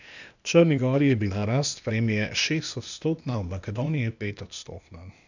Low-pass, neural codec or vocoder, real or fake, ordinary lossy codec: 7.2 kHz; codec, 16 kHz, 0.8 kbps, ZipCodec; fake; none